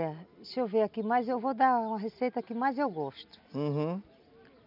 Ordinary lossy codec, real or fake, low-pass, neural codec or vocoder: none; real; 5.4 kHz; none